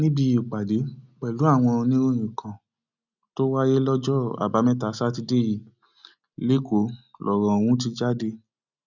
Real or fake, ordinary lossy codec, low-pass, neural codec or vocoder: real; none; 7.2 kHz; none